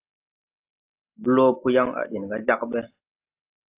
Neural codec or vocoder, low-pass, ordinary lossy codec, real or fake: none; 3.6 kHz; AAC, 32 kbps; real